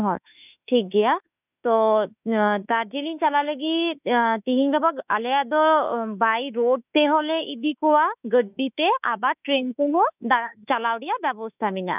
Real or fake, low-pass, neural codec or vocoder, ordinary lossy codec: fake; 3.6 kHz; autoencoder, 48 kHz, 32 numbers a frame, DAC-VAE, trained on Japanese speech; none